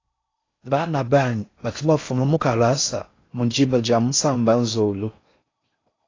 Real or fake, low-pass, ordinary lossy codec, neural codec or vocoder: fake; 7.2 kHz; AAC, 32 kbps; codec, 16 kHz in and 24 kHz out, 0.6 kbps, FocalCodec, streaming, 4096 codes